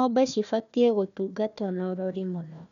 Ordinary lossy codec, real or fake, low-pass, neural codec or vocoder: none; fake; 7.2 kHz; codec, 16 kHz, 1 kbps, FunCodec, trained on Chinese and English, 50 frames a second